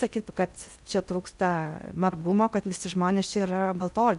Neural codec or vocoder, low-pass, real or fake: codec, 16 kHz in and 24 kHz out, 0.6 kbps, FocalCodec, streaming, 4096 codes; 10.8 kHz; fake